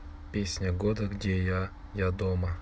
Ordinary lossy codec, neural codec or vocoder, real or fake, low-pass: none; none; real; none